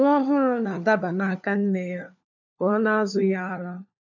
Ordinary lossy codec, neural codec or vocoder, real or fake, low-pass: none; codec, 16 kHz, 4 kbps, FunCodec, trained on LibriTTS, 50 frames a second; fake; 7.2 kHz